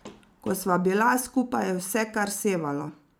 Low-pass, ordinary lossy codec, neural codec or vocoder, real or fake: none; none; vocoder, 44.1 kHz, 128 mel bands every 256 samples, BigVGAN v2; fake